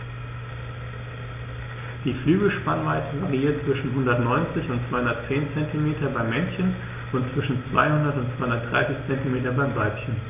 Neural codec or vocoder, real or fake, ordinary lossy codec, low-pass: none; real; none; 3.6 kHz